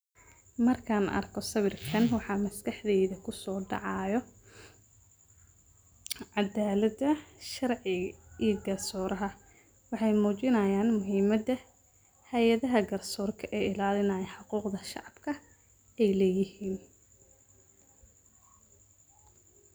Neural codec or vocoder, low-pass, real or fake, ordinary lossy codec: none; none; real; none